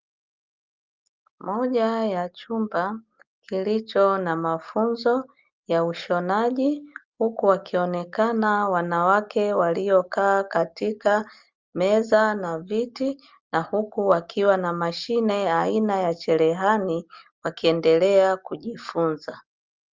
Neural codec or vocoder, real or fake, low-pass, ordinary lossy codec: none; real; 7.2 kHz; Opus, 32 kbps